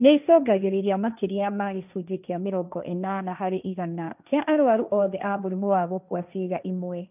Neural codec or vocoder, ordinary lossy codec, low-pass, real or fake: codec, 16 kHz, 1.1 kbps, Voila-Tokenizer; none; 3.6 kHz; fake